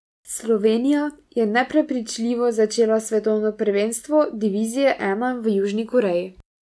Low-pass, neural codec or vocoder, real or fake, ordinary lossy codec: none; none; real; none